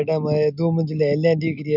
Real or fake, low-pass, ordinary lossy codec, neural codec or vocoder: real; 5.4 kHz; none; none